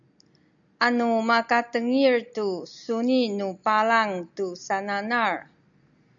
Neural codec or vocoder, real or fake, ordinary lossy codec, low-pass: none; real; MP3, 96 kbps; 7.2 kHz